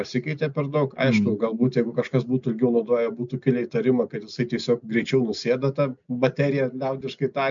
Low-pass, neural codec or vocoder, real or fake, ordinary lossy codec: 7.2 kHz; none; real; MP3, 64 kbps